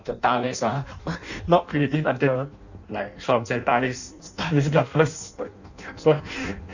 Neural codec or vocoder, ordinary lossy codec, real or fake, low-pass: codec, 16 kHz in and 24 kHz out, 0.6 kbps, FireRedTTS-2 codec; none; fake; 7.2 kHz